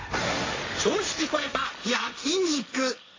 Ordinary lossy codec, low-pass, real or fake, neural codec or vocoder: AAC, 32 kbps; 7.2 kHz; fake; codec, 16 kHz, 1.1 kbps, Voila-Tokenizer